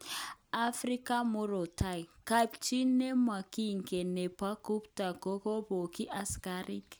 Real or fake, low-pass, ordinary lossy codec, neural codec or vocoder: real; none; none; none